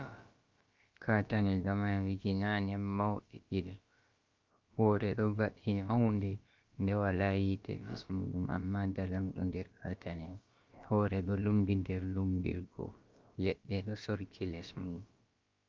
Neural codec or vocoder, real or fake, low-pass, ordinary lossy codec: codec, 16 kHz, about 1 kbps, DyCAST, with the encoder's durations; fake; 7.2 kHz; Opus, 24 kbps